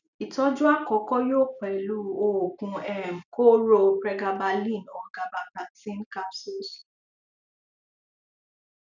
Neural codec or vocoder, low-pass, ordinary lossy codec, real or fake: none; 7.2 kHz; none; real